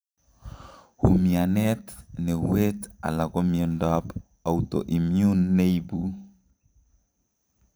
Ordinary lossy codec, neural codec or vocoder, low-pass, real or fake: none; vocoder, 44.1 kHz, 128 mel bands every 512 samples, BigVGAN v2; none; fake